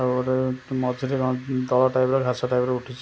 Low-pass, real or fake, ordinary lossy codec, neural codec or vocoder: none; real; none; none